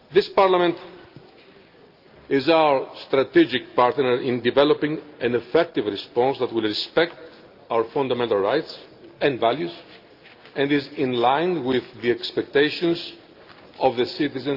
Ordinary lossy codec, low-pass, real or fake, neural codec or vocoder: Opus, 24 kbps; 5.4 kHz; real; none